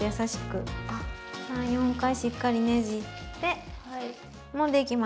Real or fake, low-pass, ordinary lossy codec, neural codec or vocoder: real; none; none; none